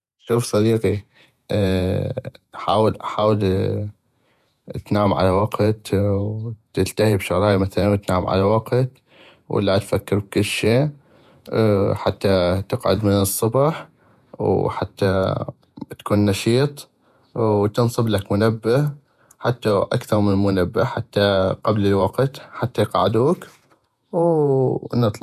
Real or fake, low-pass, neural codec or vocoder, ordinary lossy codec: fake; 14.4 kHz; vocoder, 48 kHz, 128 mel bands, Vocos; none